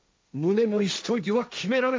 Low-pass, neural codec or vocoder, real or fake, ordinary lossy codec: none; codec, 16 kHz, 1.1 kbps, Voila-Tokenizer; fake; none